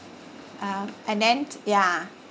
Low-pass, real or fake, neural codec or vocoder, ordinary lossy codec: none; real; none; none